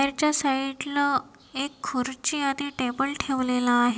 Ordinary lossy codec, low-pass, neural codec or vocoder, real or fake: none; none; none; real